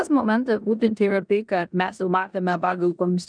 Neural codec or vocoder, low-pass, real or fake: codec, 16 kHz in and 24 kHz out, 0.9 kbps, LongCat-Audio-Codec, four codebook decoder; 9.9 kHz; fake